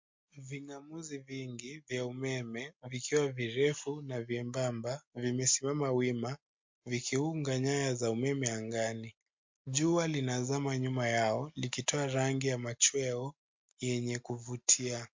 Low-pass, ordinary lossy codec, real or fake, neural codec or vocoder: 7.2 kHz; MP3, 48 kbps; real; none